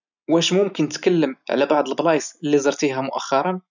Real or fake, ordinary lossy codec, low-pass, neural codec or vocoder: real; none; 7.2 kHz; none